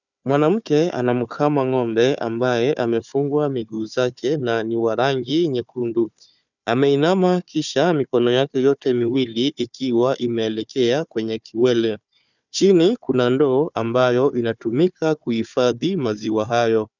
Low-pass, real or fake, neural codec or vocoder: 7.2 kHz; fake; codec, 16 kHz, 4 kbps, FunCodec, trained on Chinese and English, 50 frames a second